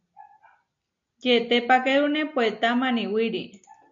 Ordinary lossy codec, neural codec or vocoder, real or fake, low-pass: MP3, 48 kbps; none; real; 7.2 kHz